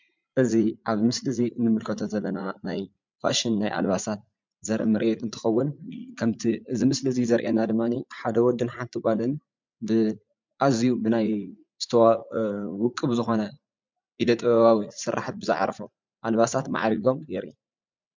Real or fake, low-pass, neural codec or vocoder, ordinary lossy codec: fake; 7.2 kHz; vocoder, 44.1 kHz, 80 mel bands, Vocos; MP3, 64 kbps